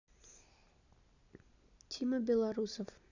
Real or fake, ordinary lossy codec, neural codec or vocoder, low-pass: real; none; none; 7.2 kHz